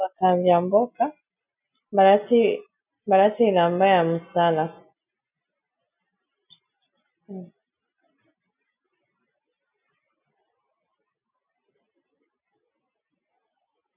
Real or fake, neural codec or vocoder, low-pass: real; none; 3.6 kHz